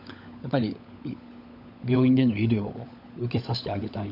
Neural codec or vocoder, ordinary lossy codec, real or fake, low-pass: codec, 16 kHz, 16 kbps, FunCodec, trained on LibriTTS, 50 frames a second; none; fake; 5.4 kHz